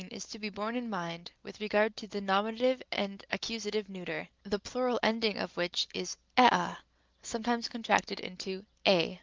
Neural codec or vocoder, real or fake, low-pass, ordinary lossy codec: none; real; 7.2 kHz; Opus, 32 kbps